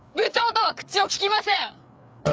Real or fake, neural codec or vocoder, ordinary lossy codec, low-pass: fake; codec, 16 kHz, 4 kbps, FreqCodec, larger model; none; none